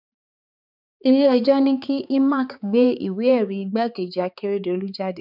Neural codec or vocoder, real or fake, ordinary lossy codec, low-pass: codec, 16 kHz, 4 kbps, X-Codec, HuBERT features, trained on balanced general audio; fake; none; 5.4 kHz